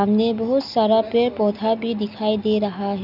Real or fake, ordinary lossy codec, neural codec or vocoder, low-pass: real; none; none; 5.4 kHz